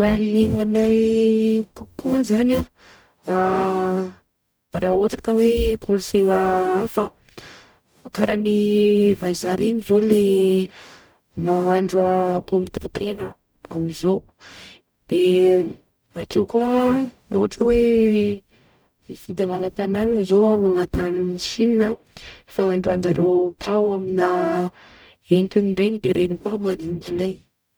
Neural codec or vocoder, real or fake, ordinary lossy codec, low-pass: codec, 44.1 kHz, 0.9 kbps, DAC; fake; none; none